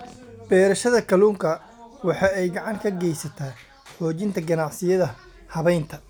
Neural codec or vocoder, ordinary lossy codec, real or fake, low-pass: none; none; real; none